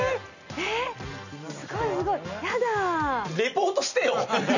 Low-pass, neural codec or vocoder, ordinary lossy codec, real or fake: 7.2 kHz; none; none; real